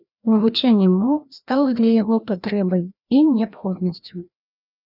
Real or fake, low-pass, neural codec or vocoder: fake; 5.4 kHz; codec, 16 kHz, 1 kbps, FreqCodec, larger model